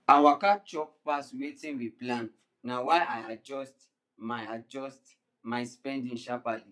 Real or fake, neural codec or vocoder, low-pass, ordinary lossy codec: fake; vocoder, 22.05 kHz, 80 mel bands, Vocos; none; none